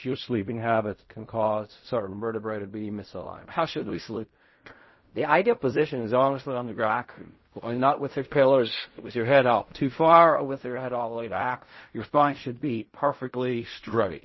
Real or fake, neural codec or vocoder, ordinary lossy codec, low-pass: fake; codec, 16 kHz in and 24 kHz out, 0.4 kbps, LongCat-Audio-Codec, fine tuned four codebook decoder; MP3, 24 kbps; 7.2 kHz